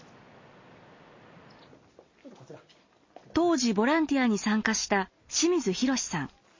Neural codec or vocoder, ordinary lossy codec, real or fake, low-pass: none; MP3, 32 kbps; real; 7.2 kHz